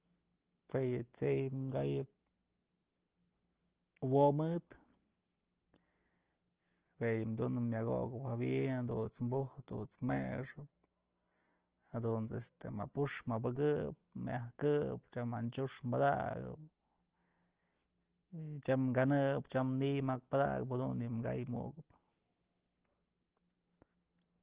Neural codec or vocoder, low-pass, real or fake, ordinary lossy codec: none; 3.6 kHz; real; Opus, 16 kbps